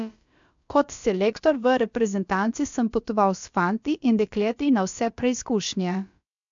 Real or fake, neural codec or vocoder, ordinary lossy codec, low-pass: fake; codec, 16 kHz, about 1 kbps, DyCAST, with the encoder's durations; MP3, 64 kbps; 7.2 kHz